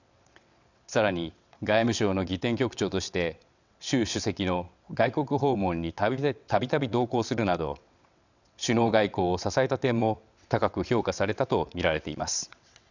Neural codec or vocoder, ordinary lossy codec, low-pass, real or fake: vocoder, 22.05 kHz, 80 mel bands, WaveNeXt; none; 7.2 kHz; fake